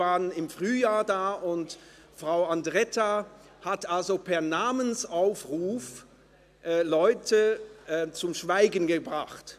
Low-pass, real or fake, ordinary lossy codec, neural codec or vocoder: 14.4 kHz; real; AAC, 96 kbps; none